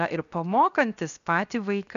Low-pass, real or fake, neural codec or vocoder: 7.2 kHz; fake; codec, 16 kHz, 0.7 kbps, FocalCodec